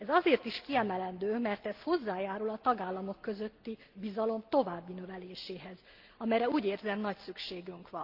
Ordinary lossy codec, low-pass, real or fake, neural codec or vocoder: Opus, 32 kbps; 5.4 kHz; real; none